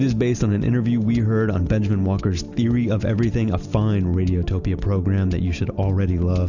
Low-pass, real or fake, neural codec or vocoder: 7.2 kHz; real; none